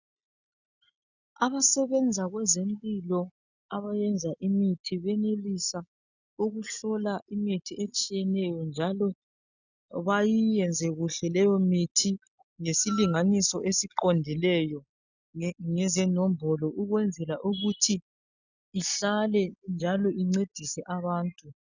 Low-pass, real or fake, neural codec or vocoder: 7.2 kHz; real; none